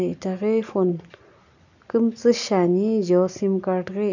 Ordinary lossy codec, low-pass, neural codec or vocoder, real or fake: none; 7.2 kHz; none; real